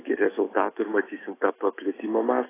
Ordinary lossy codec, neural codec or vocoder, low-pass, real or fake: AAC, 16 kbps; autoencoder, 48 kHz, 128 numbers a frame, DAC-VAE, trained on Japanese speech; 3.6 kHz; fake